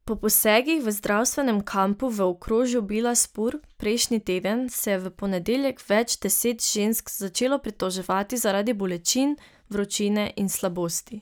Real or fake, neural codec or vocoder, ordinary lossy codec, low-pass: real; none; none; none